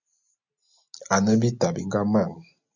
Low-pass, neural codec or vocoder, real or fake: 7.2 kHz; none; real